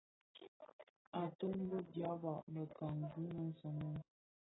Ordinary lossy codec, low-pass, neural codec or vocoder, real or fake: AAC, 16 kbps; 7.2 kHz; none; real